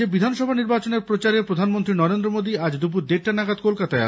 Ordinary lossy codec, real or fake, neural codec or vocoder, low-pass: none; real; none; none